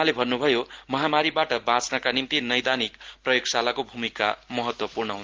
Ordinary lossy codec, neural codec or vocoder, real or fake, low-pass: Opus, 16 kbps; none; real; 7.2 kHz